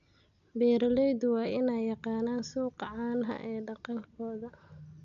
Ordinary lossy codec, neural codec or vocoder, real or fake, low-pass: none; none; real; 7.2 kHz